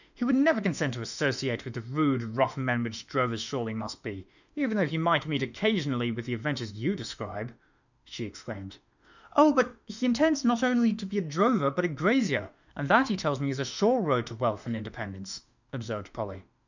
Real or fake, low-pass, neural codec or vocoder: fake; 7.2 kHz; autoencoder, 48 kHz, 32 numbers a frame, DAC-VAE, trained on Japanese speech